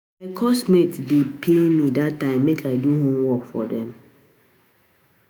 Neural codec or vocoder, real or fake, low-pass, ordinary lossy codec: autoencoder, 48 kHz, 128 numbers a frame, DAC-VAE, trained on Japanese speech; fake; none; none